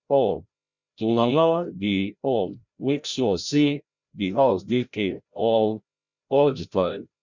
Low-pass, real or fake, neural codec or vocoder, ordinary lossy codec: 7.2 kHz; fake; codec, 16 kHz, 0.5 kbps, FreqCodec, larger model; Opus, 64 kbps